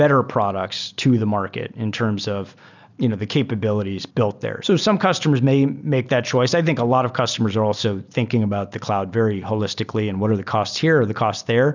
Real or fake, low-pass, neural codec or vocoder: real; 7.2 kHz; none